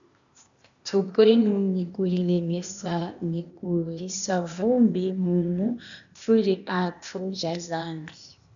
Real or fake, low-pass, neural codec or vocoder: fake; 7.2 kHz; codec, 16 kHz, 0.8 kbps, ZipCodec